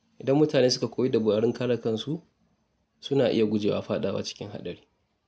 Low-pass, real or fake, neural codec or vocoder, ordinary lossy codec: none; real; none; none